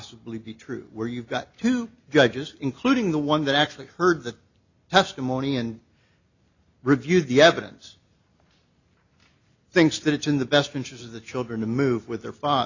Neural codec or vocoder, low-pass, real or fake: none; 7.2 kHz; real